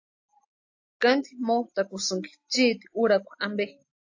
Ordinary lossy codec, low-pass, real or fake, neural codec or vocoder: AAC, 48 kbps; 7.2 kHz; real; none